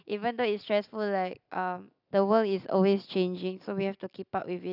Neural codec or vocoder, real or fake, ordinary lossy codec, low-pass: none; real; none; 5.4 kHz